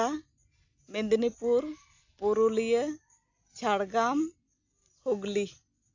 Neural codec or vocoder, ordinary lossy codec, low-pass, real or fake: none; AAC, 48 kbps; 7.2 kHz; real